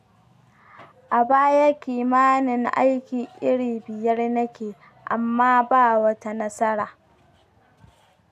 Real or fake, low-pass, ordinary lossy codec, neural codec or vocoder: real; 14.4 kHz; none; none